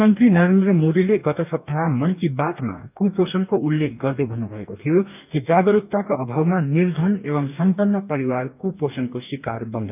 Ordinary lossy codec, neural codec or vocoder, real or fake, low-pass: none; codec, 44.1 kHz, 2.6 kbps, DAC; fake; 3.6 kHz